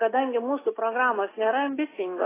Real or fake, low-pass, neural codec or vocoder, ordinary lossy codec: real; 3.6 kHz; none; AAC, 16 kbps